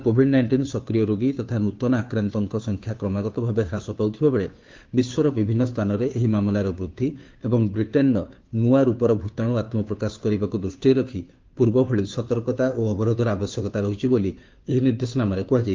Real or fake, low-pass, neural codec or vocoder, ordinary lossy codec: fake; 7.2 kHz; codec, 16 kHz, 2 kbps, FunCodec, trained on Chinese and English, 25 frames a second; Opus, 32 kbps